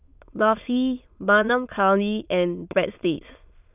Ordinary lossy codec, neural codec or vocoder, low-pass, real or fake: none; autoencoder, 22.05 kHz, a latent of 192 numbers a frame, VITS, trained on many speakers; 3.6 kHz; fake